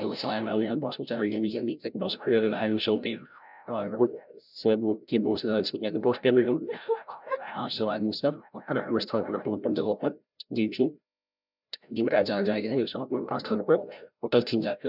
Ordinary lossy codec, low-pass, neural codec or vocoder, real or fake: none; 5.4 kHz; codec, 16 kHz, 0.5 kbps, FreqCodec, larger model; fake